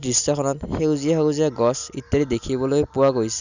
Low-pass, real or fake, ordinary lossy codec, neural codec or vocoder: 7.2 kHz; real; none; none